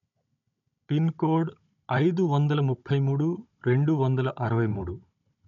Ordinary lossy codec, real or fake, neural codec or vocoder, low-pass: none; fake; codec, 16 kHz, 16 kbps, FunCodec, trained on Chinese and English, 50 frames a second; 7.2 kHz